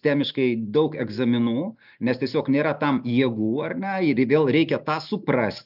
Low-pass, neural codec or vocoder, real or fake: 5.4 kHz; none; real